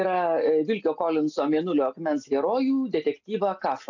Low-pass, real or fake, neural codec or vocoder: 7.2 kHz; real; none